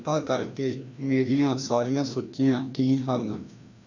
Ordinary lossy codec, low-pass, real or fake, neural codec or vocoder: none; 7.2 kHz; fake; codec, 16 kHz, 1 kbps, FreqCodec, larger model